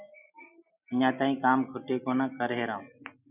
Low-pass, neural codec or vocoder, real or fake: 3.6 kHz; none; real